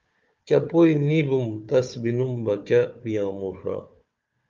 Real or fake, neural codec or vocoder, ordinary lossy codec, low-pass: fake; codec, 16 kHz, 4 kbps, FunCodec, trained on Chinese and English, 50 frames a second; Opus, 24 kbps; 7.2 kHz